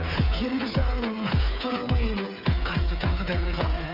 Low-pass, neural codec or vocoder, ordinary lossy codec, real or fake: 5.4 kHz; codec, 16 kHz, 8 kbps, FreqCodec, smaller model; none; fake